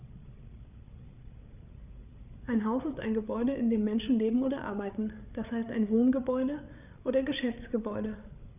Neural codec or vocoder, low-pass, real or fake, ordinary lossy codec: codec, 16 kHz, 8 kbps, FreqCodec, larger model; 3.6 kHz; fake; none